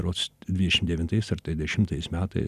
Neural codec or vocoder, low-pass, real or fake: none; 14.4 kHz; real